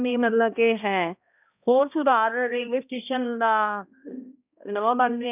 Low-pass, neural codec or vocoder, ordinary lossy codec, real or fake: 3.6 kHz; codec, 16 kHz, 1 kbps, X-Codec, HuBERT features, trained on balanced general audio; none; fake